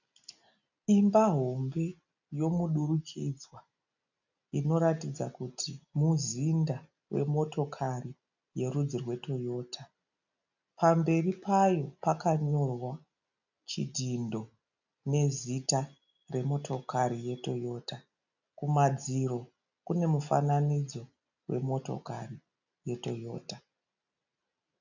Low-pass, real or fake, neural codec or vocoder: 7.2 kHz; real; none